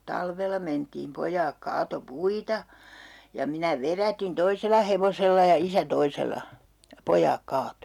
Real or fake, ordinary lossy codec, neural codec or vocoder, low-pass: real; none; none; 19.8 kHz